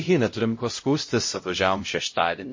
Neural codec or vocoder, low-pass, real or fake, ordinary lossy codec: codec, 16 kHz, 0.5 kbps, X-Codec, HuBERT features, trained on LibriSpeech; 7.2 kHz; fake; MP3, 32 kbps